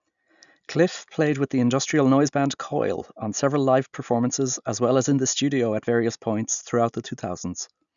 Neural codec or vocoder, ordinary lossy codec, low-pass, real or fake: none; none; 7.2 kHz; real